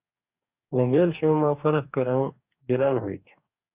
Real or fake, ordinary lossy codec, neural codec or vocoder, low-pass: fake; Opus, 64 kbps; codec, 44.1 kHz, 2.6 kbps, DAC; 3.6 kHz